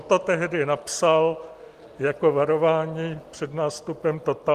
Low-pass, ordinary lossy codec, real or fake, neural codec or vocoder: 14.4 kHz; Opus, 24 kbps; real; none